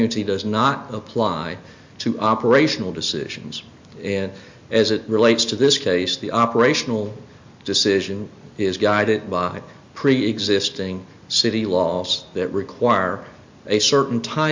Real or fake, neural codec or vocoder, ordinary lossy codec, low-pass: real; none; MP3, 48 kbps; 7.2 kHz